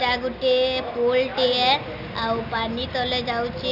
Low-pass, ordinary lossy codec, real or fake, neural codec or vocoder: 5.4 kHz; none; real; none